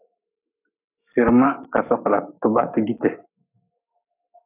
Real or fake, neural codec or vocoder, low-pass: fake; codec, 44.1 kHz, 7.8 kbps, Pupu-Codec; 3.6 kHz